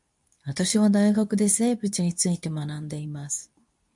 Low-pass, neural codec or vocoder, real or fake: 10.8 kHz; codec, 24 kHz, 0.9 kbps, WavTokenizer, medium speech release version 2; fake